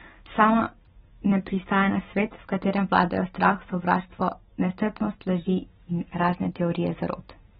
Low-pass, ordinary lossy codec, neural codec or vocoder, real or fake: 9.9 kHz; AAC, 16 kbps; none; real